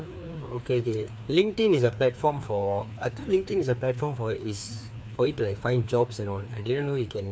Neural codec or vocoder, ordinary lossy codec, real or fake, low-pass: codec, 16 kHz, 2 kbps, FreqCodec, larger model; none; fake; none